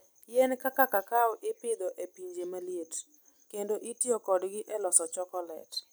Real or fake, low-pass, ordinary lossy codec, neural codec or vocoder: real; none; none; none